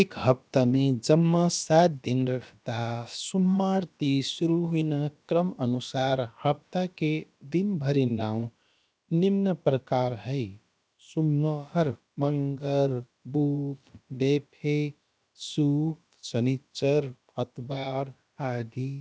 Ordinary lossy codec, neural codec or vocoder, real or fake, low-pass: none; codec, 16 kHz, about 1 kbps, DyCAST, with the encoder's durations; fake; none